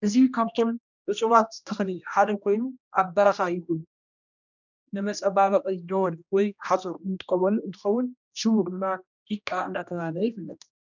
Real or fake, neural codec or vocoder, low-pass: fake; codec, 16 kHz, 1 kbps, X-Codec, HuBERT features, trained on general audio; 7.2 kHz